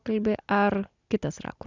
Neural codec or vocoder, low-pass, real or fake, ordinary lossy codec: none; 7.2 kHz; real; Opus, 64 kbps